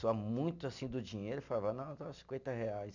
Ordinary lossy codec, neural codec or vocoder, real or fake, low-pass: none; none; real; 7.2 kHz